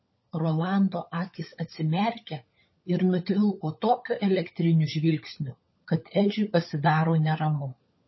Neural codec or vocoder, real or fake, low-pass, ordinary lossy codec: codec, 16 kHz, 16 kbps, FunCodec, trained on LibriTTS, 50 frames a second; fake; 7.2 kHz; MP3, 24 kbps